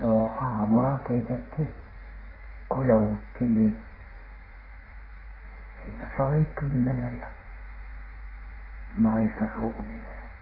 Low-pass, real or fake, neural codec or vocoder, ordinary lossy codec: 5.4 kHz; fake; codec, 16 kHz in and 24 kHz out, 1.1 kbps, FireRedTTS-2 codec; AAC, 24 kbps